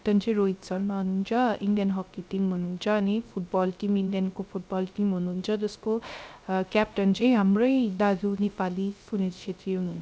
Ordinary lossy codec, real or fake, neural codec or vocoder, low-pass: none; fake; codec, 16 kHz, 0.3 kbps, FocalCodec; none